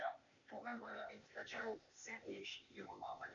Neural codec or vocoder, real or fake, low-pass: codec, 16 kHz, 0.8 kbps, ZipCodec; fake; 7.2 kHz